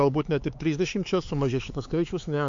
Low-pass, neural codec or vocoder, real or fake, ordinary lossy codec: 7.2 kHz; codec, 16 kHz, 4 kbps, X-Codec, HuBERT features, trained on LibriSpeech; fake; MP3, 48 kbps